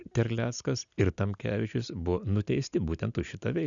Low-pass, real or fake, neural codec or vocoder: 7.2 kHz; real; none